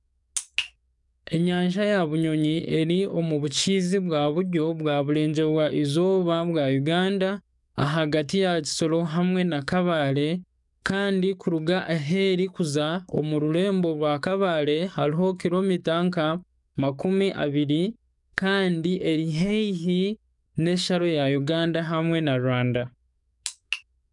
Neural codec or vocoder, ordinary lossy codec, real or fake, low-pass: codec, 44.1 kHz, 7.8 kbps, DAC; none; fake; 10.8 kHz